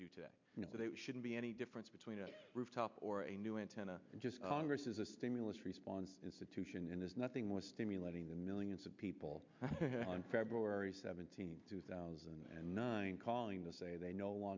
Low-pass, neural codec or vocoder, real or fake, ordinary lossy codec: 7.2 kHz; none; real; MP3, 64 kbps